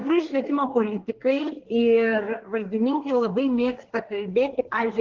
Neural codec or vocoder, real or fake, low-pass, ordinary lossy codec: codec, 24 kHz, 1 kbps, SNAC; fake; 7.2 kHz; Opus, 16 kbps